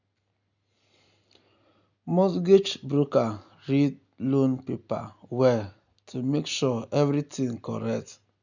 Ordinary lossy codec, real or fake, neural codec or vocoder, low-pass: none; real; none; 7.2 kHz